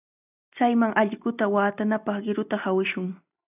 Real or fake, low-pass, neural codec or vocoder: real; 3.6 kHz; none